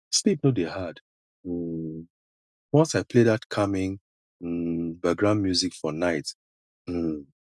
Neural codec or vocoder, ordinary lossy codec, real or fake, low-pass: none; none; real; none